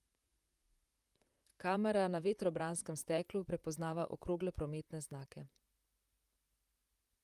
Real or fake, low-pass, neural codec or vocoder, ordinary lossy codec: fake; 14.4 kHz; vocoder, 44.1 kHz, 128 mel bands, Pupu-Vocoder; Opus, 32 kbps